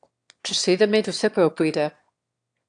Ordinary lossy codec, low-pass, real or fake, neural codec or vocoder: AAC, 64 kbps; 9.9 kHz; fake; autoencoder, 22.05 kHz, a latent of 192 numbers a frame, VITS, trained on one speaker